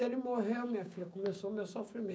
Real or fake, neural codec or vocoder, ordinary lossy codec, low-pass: fake; codec, 16 kHz, 6 kbps, DAC; none; none